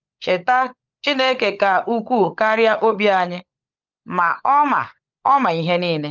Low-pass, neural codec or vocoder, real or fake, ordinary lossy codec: 7.2 kHz; codec, 16 kHz, 16 kbps, FunCodec, trained on LibriTTS, 50 frames a second; fake; Opus, 24 kbps